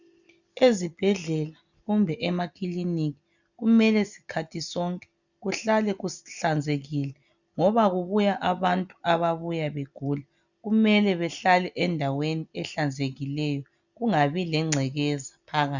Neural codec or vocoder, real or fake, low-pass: none; real; 7.2 kHz